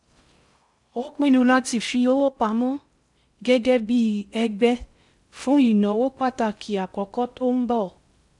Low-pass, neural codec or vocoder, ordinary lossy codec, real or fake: 10.8 kHz; codec, 16 kHz in and 24 kHz out, 0.6 kbps, FocalCodec, streaming, 4096 codes; none; fake